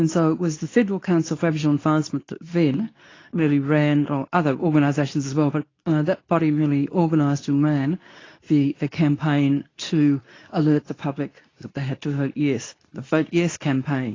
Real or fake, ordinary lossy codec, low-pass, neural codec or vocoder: fake; AAC, 32 kbps; 7.2 kHz; codec, 24 kHz, 0.9 kbps, WavTokenizer, medium speech release version 2